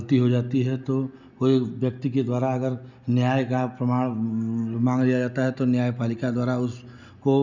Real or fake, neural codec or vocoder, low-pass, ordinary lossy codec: real; none; 7.2 kHz; none